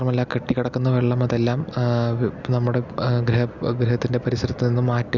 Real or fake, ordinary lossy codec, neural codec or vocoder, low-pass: real; none; none; 7.2 kHz